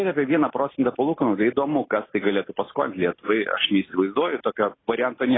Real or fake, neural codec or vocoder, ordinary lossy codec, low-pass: real; none; AAC, 16 kbps; 7.2 kHz